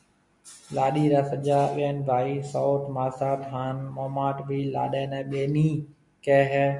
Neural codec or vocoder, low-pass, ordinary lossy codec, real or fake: none; 10.8 kHz; MP3, 96 kbps; real